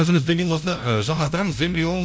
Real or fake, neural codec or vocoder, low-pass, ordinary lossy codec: fake; codec, 16 kHz, 0.5 kbps, FunCodec, trained on LibriTTS, 25 frames a second; none; none